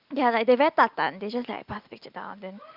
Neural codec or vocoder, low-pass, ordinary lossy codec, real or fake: none; 5.4 kHz; Opus, 24 kbps; real